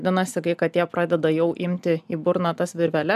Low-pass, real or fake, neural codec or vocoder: 14.4 kHz; real; none